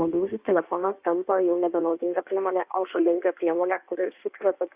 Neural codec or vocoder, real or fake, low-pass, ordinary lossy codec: codec, 16 kHz in and 24 kHz out, 1.1 kbps, FireRedTTS-2 codec; fake; 3.6 kHz; Opus, 64 kbps